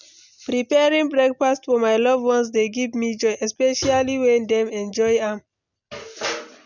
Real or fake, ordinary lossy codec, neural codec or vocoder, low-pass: real; none; none; 7.2 kHz